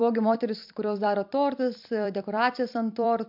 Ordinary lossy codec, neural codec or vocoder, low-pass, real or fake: MP3, 48 kbps; vocoder, 44.1 kHz, 128 mel bands every 256 samples, BigVGAN v2; 5.4 kHz; fake